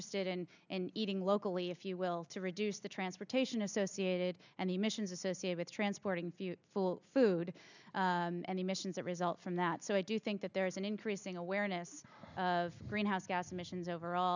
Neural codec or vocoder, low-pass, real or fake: none; 7.2 kHz; real